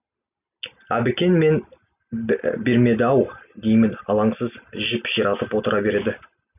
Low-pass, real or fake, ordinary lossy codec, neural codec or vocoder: 3.6 kHz; real; none; none